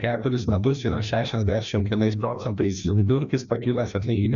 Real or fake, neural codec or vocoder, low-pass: fake; codec, 16 kHz, 1 kbps, FreqCodec, larger model; 7.2 kHz